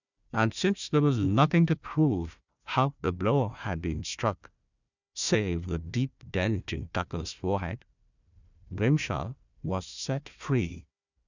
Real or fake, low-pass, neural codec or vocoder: fake; 7.2 kHz; codec, 16 kHz, 1 kbps, FunCodec, trained on Chinese and English, 50 frames a second